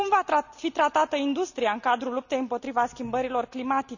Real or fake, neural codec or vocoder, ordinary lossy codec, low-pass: real; none; none; 7.2 kHz